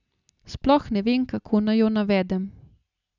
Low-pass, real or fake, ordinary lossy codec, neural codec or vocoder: 7.2 kHz; real; none; none